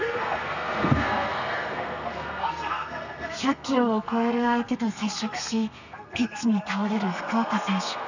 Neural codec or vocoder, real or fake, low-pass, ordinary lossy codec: codec, 32 kHz, 1.9 kbps, SNAC; fake; 7.2 kHz; none